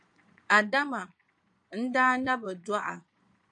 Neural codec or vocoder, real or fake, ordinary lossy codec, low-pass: vocoder, 22.05 kHz, 80 mel bands, Vocos; fake; AAC, 64 kbps; 9.9 kHz